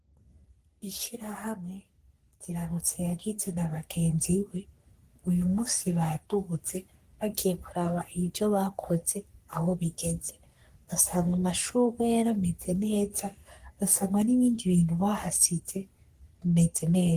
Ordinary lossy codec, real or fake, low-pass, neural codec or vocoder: Opus, 24 kbps; fake; 14.4 kHz; codec, 44.1 kHz, 3.4 kbps, Pupu-Codec